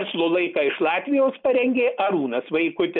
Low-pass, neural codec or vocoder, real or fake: 5.4 kHz; none; real